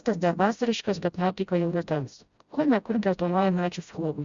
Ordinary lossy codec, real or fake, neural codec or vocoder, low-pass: Opus, 64 kbps; fake; codec, 16 kHz, 0.5 kbps, FreqCodec, smaller model; 7.2 kHz